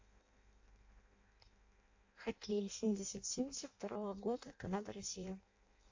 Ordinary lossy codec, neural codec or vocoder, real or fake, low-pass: AAC, 48 kbps; codec, 16 kHz in and 24 kHz out, 0.6 kbps, FireRedTTS-2 codec; fake; 7.2 kHz